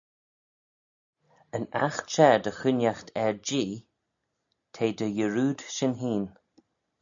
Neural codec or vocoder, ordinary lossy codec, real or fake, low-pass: none; AAC, 64 kbps; real; 7.2 kHz